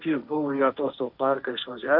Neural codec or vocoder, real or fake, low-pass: codec, 16 kHz, 1.1 kbps, Voila-Tokenizer; fake; 5.4 kHz